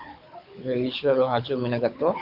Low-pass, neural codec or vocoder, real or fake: 5.4 kHz; codec, 24 kHz, 6 kbps, HILCodec; fake